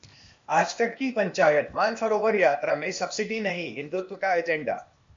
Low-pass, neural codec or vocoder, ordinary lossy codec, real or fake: 7.2 kHz; codec, 16 kHz, 0.8 kbps, ZipCodec; MP3, 48 kbps; fake